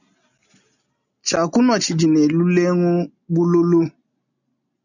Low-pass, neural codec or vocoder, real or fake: 7.2 kHz; none; real